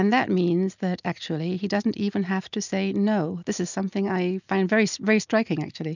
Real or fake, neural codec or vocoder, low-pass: real; none; 7.2 kHz